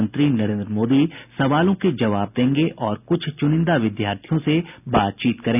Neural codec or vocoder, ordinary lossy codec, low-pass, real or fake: none; none; 3.6 kHz; real